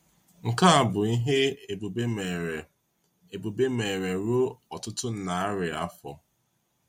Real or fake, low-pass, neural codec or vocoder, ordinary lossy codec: real; 19.8 kHz; none; MP3, 64 kbps